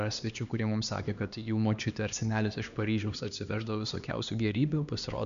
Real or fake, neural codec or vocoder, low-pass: fake; codec, 16 kHz, 2 kbps, X-Codec, HuBERT features, trained on LibriSpeech; 7.2 kHz